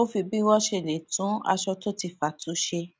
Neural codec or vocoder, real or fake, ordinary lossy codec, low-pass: none; real; none; none